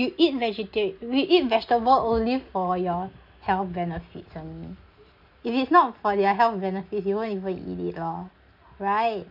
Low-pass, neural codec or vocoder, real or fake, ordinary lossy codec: 5.4 kHz; none; real; none